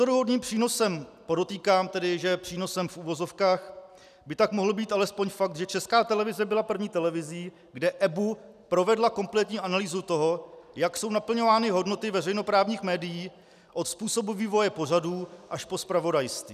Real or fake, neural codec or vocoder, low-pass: real; none; 14.4 kHz